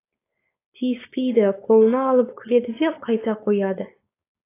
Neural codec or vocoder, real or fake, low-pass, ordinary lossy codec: codec, 16 kHz, 4.8 kbps, FACodec; fake; 3.6 kHz; AAC, 24 kbps